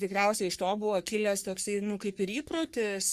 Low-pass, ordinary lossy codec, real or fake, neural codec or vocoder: 14.4 kHz; Opus, 64 kbps; fake; codec, 44.1 kHz, 3.4 kbps, Pupu-Codec